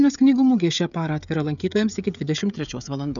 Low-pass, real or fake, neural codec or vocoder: 7.2 kHz; fake; codec, 16 kHz, 16 kbps, FreqCodec, smaller model